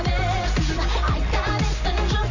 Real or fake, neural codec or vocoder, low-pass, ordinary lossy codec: real; none; 7.2 kHz; Opus, 64 kbps